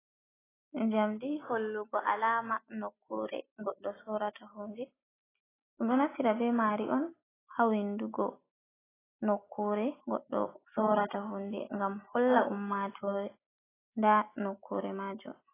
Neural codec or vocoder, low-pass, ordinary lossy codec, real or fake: none; 3.6 kHz; AAC, 16 kbps; real